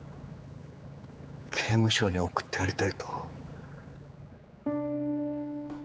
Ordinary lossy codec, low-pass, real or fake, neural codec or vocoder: none; none; fake; codec, 16 kHz, 4 kbps, X-Codec, HuBERT features, trained on general audio